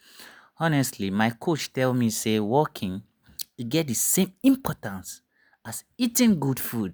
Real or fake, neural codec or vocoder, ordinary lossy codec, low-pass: real; none; none; none